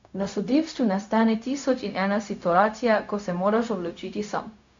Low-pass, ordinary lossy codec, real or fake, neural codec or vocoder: 7.2 kHz; MP3, 64 kbps; fake; codec, 16 kHz, 0.4 kbps, LongCat-Audio-Codec